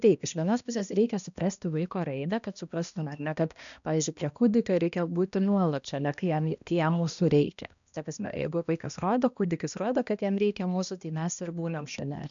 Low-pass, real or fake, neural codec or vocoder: 7.2 kHz; fake; codec, 16 kHz, 1 kbps, X-Codec, HuBERT features, trained on balanced general audio